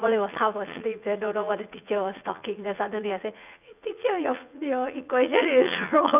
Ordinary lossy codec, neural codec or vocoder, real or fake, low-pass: none; vocoder, 44.1 kHz, 80 mel bands, Vocos; fake; 3.6 kHz